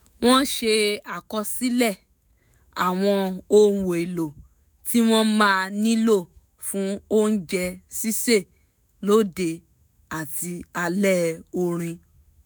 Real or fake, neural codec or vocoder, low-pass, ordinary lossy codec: fake; autoencoder, 48 kHz, 128 numbers a frame, DAC-VAE, trained on Japanese speech; none; none